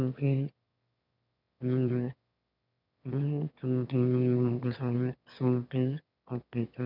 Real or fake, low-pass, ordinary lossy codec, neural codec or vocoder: fake; 5.4 kHz; none; autoencoder, 22.05 kHz, a latent of 192 numbers a frame, VITS, trained on one speaker